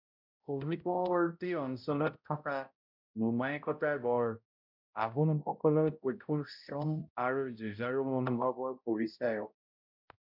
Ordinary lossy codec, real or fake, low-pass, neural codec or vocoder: MP3, 48 kbps; fake; 5.4 kHz; codec, 16 kHz, 0.5 kbps, X-Codec, HuBERT features, trained on balanced general audio